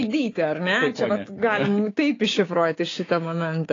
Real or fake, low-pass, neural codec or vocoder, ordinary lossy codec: fake; 7.2 kHz; codec, 16 kHz, 6 kbps, DAC; AAC, 32 kbps